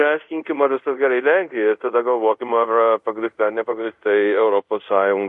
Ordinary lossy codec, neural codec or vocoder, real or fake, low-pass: MP3, 48 kbps; codec, 24 kHz, 0.5 kbps, DualCodec; fake; 10.8 kHz